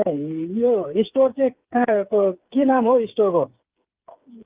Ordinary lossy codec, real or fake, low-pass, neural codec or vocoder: Opus, 32 kbps; fake; 3.6 kHz; codec, 16 kHz, 8 kbps, FreqCodec, smaller model